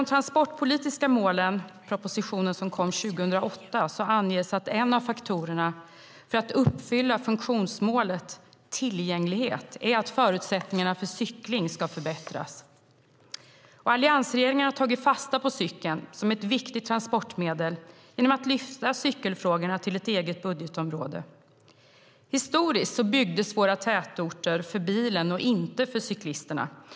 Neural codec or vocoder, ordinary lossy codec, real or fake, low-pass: none; none; real; none